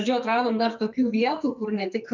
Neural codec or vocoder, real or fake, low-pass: codec, 44.1 kHz, 2.6 kbps, SNAC; fake; 7.2 kHz